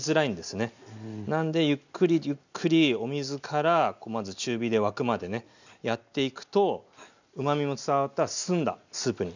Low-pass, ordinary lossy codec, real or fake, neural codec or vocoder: 7.2 kHz; none; real; none